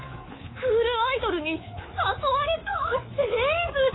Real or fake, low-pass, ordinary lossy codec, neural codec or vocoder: fake; 7.2 kHz; AAC, 16 kbps; codec, 24 kHz, 3.1 kbps, DualCodec